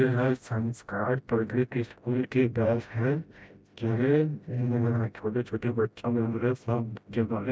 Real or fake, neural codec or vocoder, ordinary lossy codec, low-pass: fake; codec, 16 kHz, 0.5 kbps, FreqCodec, smaller model; none; none